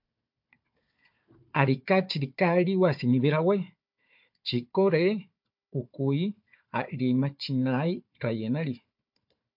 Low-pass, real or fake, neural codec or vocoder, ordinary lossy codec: 5.4 kHz; fake; codec, 16 kHz, 4 kbps, FunCodec, trained on Chinese and English, 50 frames a second; MP3, 48 kbps